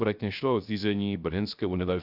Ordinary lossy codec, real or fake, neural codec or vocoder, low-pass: AAC, 48 kbps; fake; codec, 16 kHz, 0.3 kbps, FocalCodec; 5.4 kHz